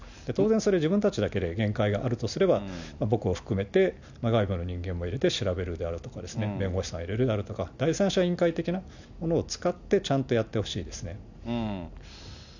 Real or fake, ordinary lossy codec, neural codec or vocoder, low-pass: real; none; none; 7.2 kHz